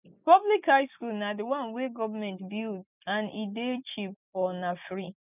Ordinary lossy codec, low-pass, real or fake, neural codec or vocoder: none; 3.6 kHz; real; none